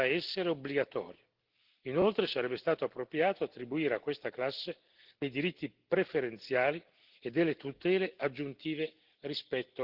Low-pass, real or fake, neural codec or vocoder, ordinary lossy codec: 5.4 kHz; real; none; Opus, 16 kbps